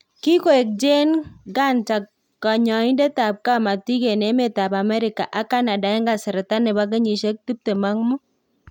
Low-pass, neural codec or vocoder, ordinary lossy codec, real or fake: 19.8 kHz; none; none; real